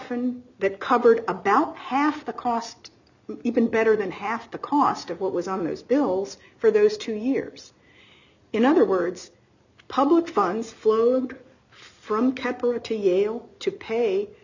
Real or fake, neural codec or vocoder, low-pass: real; none; 7.2 kHz